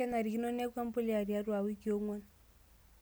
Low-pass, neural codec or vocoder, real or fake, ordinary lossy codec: none; none; real; none